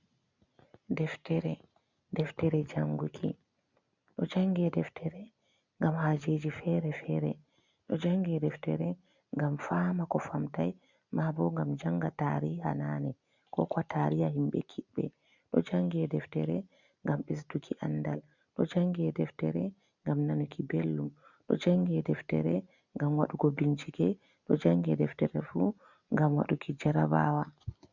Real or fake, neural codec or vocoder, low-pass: real; none; 7.2 kHz